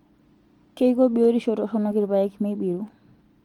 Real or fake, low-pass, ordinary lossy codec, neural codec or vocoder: real; 19.8 kHz; Opus, 24 kbps; none